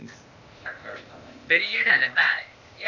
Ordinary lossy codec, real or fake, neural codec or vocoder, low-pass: none; fake; codec, 16 kHz, 0.8 kbps, ZipCodec; 7.2 kHz